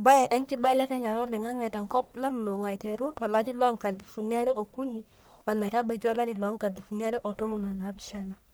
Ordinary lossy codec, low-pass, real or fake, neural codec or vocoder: none; none; fake; codec, 44.1 kHz, 1.7 kbps, Pupu-Codec